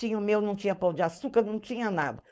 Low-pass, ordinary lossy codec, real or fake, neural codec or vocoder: none; none; fake; codec, 16 kHz, 4.8 kbps, FACodec